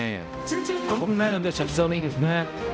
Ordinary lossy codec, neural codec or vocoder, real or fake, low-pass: none; codec, 16 kHz, 0.5 kbps, X-Codec, HuBERT features, trained on balanced general audio; fake; none